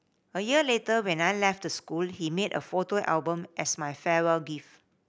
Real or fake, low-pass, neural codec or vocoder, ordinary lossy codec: real; none; none; none